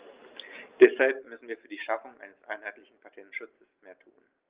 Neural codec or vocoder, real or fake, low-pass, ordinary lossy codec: none; real; 3.6 kHz; Opus, 32 kbps